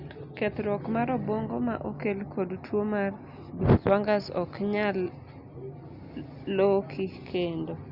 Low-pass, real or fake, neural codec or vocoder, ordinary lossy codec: 5.4 kHz; real; none; Opus, 64 kbps